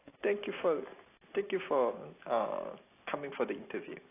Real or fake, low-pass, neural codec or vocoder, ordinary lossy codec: real; 3.6 kHz; none; AAC, 24 kbps